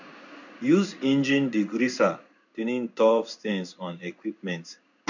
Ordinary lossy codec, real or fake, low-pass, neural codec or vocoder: none; fake; 7.2 kHz; codec, 16 kHz in and 24 kHz out, 1 kbps, XY-Tokenizer